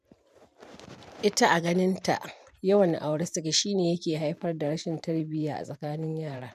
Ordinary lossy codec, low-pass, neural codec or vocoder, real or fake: none; 14.4 kHz; vocoder, 44.1 kHz, 128 mel bands every 512 samples, BigVGAN v2; fake